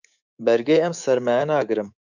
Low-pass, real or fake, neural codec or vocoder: 7.2 kHz; fake; codec, 24 kHz, 3.1 kbps, DualCodec